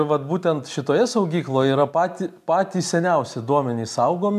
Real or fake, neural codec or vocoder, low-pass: real; none; 14.4 kHz